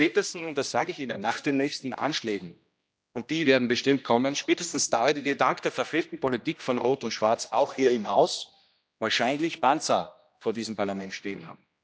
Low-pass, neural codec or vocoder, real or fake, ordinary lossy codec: none; codec, 16 kHz, 1 kbps, X-Codec, HuBERT features, trained on general audio; fake; none